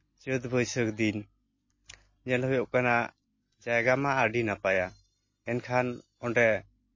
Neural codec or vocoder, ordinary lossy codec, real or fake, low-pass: none; MP3, 32 kbps; real; 7.2 kHz